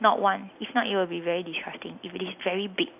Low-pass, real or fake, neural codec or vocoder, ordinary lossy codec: 3.6 kHz; real; none; AAC, 32 kbps